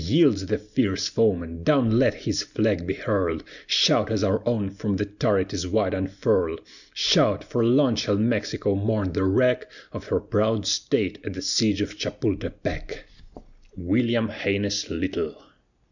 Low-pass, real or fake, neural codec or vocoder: 7.2 kHz; real; none